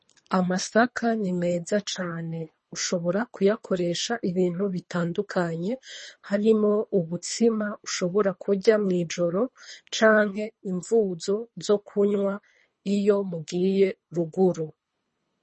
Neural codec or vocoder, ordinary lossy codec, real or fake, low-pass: codec, 24 kHz, 3 kbps, HILCodec; MP3, 32 kbps; fake; 10.8 kHz